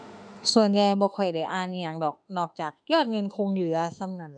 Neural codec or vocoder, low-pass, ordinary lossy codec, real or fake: autoencoder, 48 kHz, 32 numbers a frame, DAC-VAE, trained on Japanese speech; 9.9 kHz; none; fake